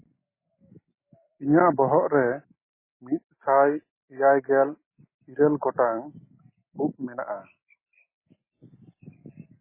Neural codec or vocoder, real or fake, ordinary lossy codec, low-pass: none; real; MP3, 16 kbps; 3.6 kHz